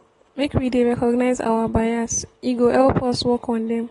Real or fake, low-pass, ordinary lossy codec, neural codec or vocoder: real; 10.8 kHz; AAC, 32 kbps; none